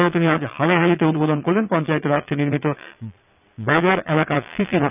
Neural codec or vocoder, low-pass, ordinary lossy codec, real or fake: vocoder, 22.05 kHz, 80 mel bands, Vocos; 3.6 kHz; none; fake